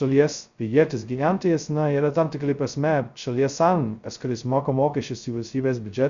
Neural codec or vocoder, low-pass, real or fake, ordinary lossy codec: codec, 16 kHz, 0.2 kbps, FocalCodec; 7.2 kHz; fake; Opus, 64 kbps